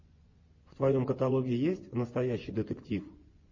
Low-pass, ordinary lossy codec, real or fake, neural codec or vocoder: 7.2 kHz; MP3, 32 kbps; fake; vocoder, 24 kHz, 100 mel bands, Vocos